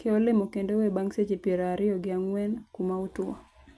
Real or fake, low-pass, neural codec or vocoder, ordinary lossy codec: real; none; none; none